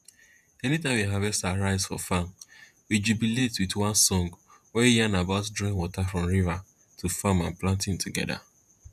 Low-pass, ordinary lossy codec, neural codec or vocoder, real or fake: 14.4 kHz; none; vocoder, 48 kHz, 128 mel bands, Vocos; fake